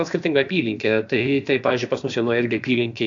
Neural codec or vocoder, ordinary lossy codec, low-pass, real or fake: codec, 16 kHz, about 1 kbps, DyCAST, with the encoder's durations; AAC, 64 kbps; 7.2 kHz; fake